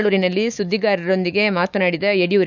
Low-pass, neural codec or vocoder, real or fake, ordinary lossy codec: 7.2 kHz; autoencoder, 48 kHz, 128 numbers a frame, DAC-VAE, trained on Japanese speech; fake; none